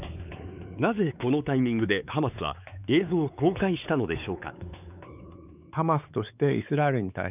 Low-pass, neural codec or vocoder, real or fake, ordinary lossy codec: 3.6 kHz; codec, 16 kHz, 4 kbps, X-Codec, WavLM features, trained on Multilingual LibriSpeech; fake; none